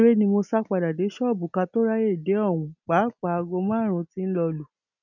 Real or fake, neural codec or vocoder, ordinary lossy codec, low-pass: real; none; none; 7.2 kHz